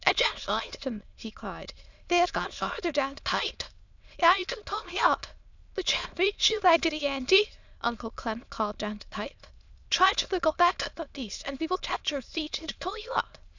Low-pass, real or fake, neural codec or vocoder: 7.2 kHz; fake; autoencoder, 22.05 kHz, a latent of 192 numbers a frame, VITS, trained on many speakers